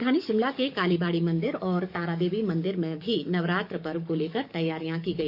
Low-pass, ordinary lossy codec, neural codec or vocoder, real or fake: 5.4 kHz; none; codec, 44.1 kHz, 7.8 kbps, DAC; fake